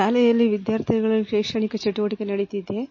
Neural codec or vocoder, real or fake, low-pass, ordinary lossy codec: none; real; 7.2 kHz; MP3, 32 kbps